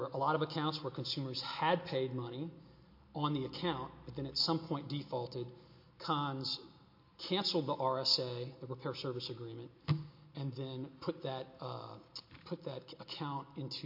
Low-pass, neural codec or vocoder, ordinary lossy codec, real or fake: 5.4 kHz; none; MP3, 32 kbps; real